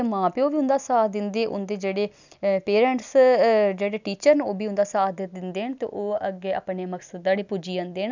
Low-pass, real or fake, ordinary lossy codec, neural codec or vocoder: 7.2 kHz; real; none; none